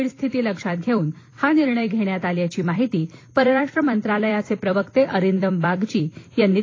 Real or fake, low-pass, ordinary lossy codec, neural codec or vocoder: fake; 7.2 kHz; AAC, 32 kbps; vocoder, 44.1 kHz, 128 mel bands every 256 samples, BigVGAN v2